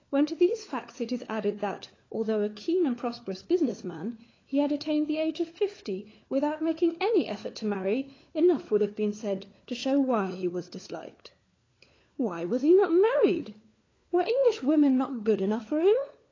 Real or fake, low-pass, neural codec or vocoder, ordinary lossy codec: fake; 7.2 kHz; codec, 16 kHz, 4 kbps, FunCodec, trained on LibriTTS, 50 frames a second; AAC, 32 kbps